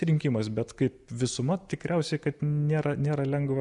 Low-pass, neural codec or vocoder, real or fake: 10.8 kHz; none; real